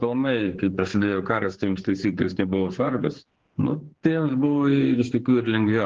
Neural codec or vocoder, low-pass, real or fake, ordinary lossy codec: codec, 16 kHz, 4 kbps, X-Codec, HuBERT features, trained on general audio; 7.2 kHz; fake; Opus, 16 kbps